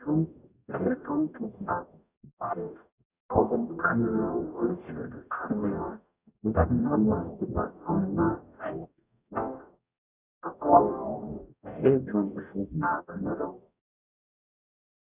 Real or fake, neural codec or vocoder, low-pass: fake; codec, 44.1 kHz, 0.9 kbps, DAC; 3.6 kHz